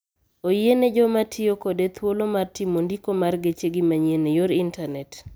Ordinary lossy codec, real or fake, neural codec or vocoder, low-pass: none; real; none; none